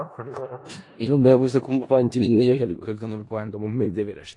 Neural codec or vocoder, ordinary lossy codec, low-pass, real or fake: codec, 16 kHz in and 24 kHz out, 0.4 kbps, LongCat-Audio-Codec, four codebook decoder; AAC, 48 kbps; 10.8 kHz; fake